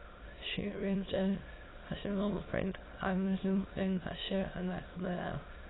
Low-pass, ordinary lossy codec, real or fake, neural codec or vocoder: 7.2 kHz; AAC, 16 kbps; fake; autoencoder, 22.05 kHz, a latent of 192 numbers a frame, VITS, trained on many speakers